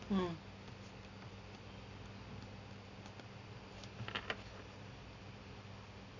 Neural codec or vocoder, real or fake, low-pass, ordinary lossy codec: none; real; 7.2 kHz; none